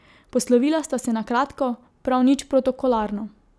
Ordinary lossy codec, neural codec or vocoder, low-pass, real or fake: none; none; none; real